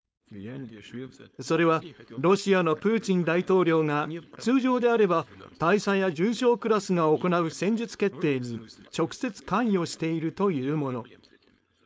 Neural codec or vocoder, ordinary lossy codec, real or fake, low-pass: codec, 16 kHz, 4.8 kbps, FACodec; none; fake; none